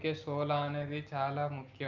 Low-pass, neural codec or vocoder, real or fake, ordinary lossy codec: 7.2 kHz; none; real; Opus, 24 kbps